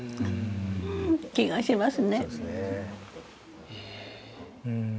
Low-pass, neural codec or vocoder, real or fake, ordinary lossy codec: none; none; real; none